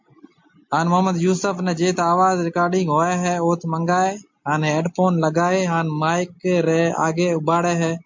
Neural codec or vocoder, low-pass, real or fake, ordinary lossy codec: none; 7.2 kHz; real; MP3, 48 kbps